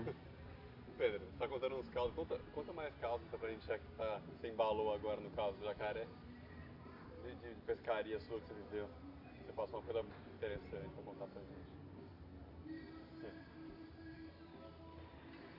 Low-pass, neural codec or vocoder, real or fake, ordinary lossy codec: 5.4 kHz; none; real; MP3, 48 kbps